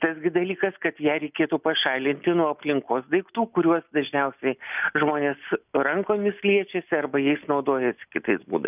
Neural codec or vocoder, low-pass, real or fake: none; 3.6 kHz; real